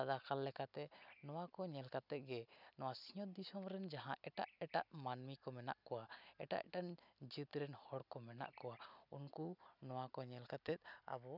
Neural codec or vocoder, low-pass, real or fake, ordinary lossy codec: none; 5.4 kHz; real; none